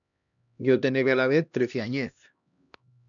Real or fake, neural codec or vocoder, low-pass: fake; codec, 16 kHz, 1 kbps, X-Codec, HuBERT features, trained on LibriSpeech; 7.2 kHz